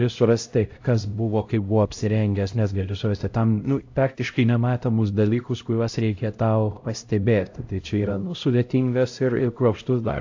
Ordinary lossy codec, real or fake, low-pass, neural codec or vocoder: AAC, 48 kbps; fake; 7.2 kHz; codec, 16 kHz, 0.5 kbps, X-Codec, HuBERT features, trained on LibriSpeech